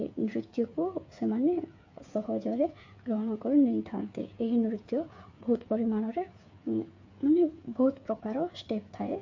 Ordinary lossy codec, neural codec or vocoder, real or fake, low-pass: MP3, 48 kbps; codec, 16 kHz, 8 kbps, FreqCodec, smaller model; fake; 7.2 kHz